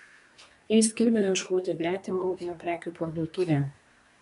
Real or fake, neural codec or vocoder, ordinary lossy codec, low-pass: fake; codec, 24 kHz, 1 kbps, SNAC; MP3, 96 kbps; 10.8 kHz